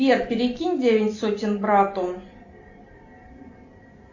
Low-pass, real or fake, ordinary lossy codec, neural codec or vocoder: 7.2 kHz; real; AAC, 48 kbps; none